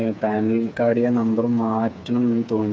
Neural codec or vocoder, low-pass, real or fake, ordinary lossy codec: codec, 16 kHz, 4 kbps, FreqCodec, smaller model; none; fake; none